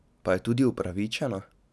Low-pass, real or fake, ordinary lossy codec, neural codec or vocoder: none; real; none; none